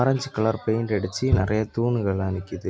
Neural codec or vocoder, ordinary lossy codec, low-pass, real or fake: none; none; none; real